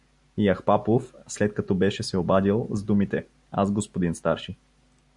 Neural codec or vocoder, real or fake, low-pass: none; real; 10.8 kHz